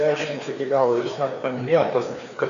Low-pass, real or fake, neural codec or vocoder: 7.2 kHz; fake; codec, 16 kHz, 2 kbps, FreqCodec, larger model